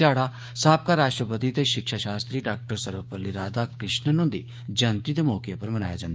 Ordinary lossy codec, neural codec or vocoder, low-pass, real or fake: none; codec, 16 kHz, 6 kbps, DAC; none; fake